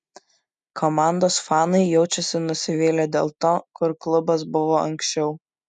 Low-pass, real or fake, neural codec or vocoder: 10.8 kHz; real; none